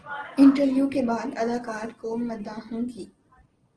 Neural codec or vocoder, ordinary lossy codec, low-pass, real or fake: none; Opus, 16 kbps; 9.9 kHz; real